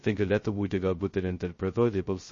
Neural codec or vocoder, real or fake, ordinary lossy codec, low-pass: codec, 16 kHz, 0.2 kbps, FocalCodec; fake; MP3, 32 kbps; 7.2 kHz